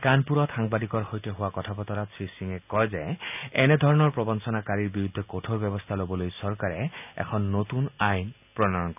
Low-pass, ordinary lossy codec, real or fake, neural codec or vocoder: 3.6 kHz; none; real; none